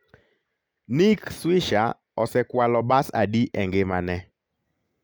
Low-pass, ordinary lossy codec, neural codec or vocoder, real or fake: none; none; none; real